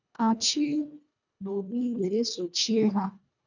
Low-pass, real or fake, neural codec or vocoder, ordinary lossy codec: 7.2 kHz; fake; codec, 24 kHz, 1.5 kbps, HILCodec; none